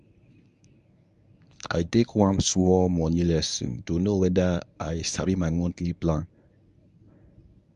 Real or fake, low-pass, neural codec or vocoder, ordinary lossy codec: fake; 10.8 kHz; codec, 24 kHz, 0.9 kbps, WavTokenizer, medium speech release version 1; none